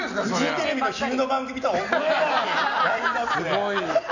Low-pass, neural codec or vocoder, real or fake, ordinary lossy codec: 7.2 kHz; none; real; none